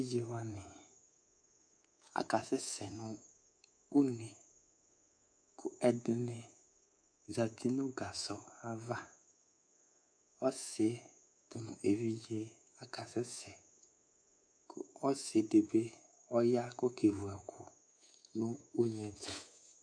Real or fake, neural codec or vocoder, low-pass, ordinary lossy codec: fake; codec, 24 kHz, 3.1 kbps, DualCodec; 9.9 kHz; AAC, 64 kbps